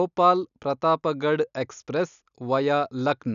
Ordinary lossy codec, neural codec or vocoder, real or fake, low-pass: none; none; real; 7.2 kHz